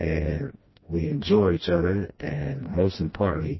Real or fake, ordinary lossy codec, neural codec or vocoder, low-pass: fake; MP3, 24 kbps; codec, 16 kHz, 1 kbps, FreqCodec, smaller model; 7.2 kHz